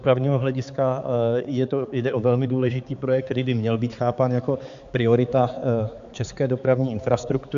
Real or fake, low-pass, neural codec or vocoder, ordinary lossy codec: fake; 7.2 kHz; codec, 16 kHz, 4 kbps, X-Codec, HuBERT features, trained on balanced general audio; MP3, 64 kbps